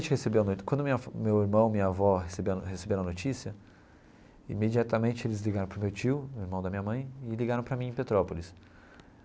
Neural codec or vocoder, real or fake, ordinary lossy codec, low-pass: none; real; none; none